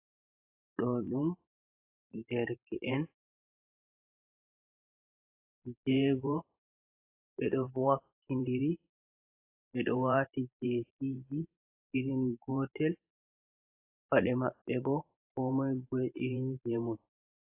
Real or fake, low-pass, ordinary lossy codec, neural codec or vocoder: fake; 3.6 kHz; AAC, 24 kbps; vocoder, 44.1 kHz, 128 mel bands every 512 samples, BigVGAN v2